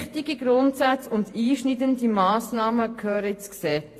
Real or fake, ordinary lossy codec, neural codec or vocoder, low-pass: fake; AAC, 48 kbps; vocoder, 48 kHz, 128 mel bands, Vocos; 14.4 kHz